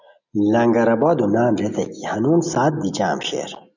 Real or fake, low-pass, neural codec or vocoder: real; 7.2 kHz; none